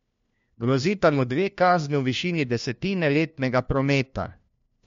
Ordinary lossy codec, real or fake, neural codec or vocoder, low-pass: MP3, 48 kbps; fake; codec, 16 kHz, 1 kbps, FunCodec, trained on LibriTTS, 50 frames a second; 7.2 kHz